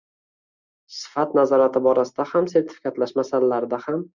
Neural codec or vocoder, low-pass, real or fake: none; 7.2 kHz; real